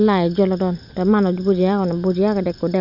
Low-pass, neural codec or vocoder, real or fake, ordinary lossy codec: 5.4 kHz; vocoder, 44.1 kHz, 128 mel bands every 256 samples, BigVGAN v2; fake; none